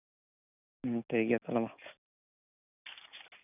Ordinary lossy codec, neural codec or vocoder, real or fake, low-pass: none; none; real; 3.6 kHz